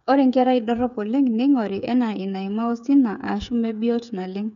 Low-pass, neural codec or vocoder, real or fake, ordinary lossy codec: 7.2 kHz; codec, 16 kHz, 8 kbps, FreqCodec, smaller model; fake; none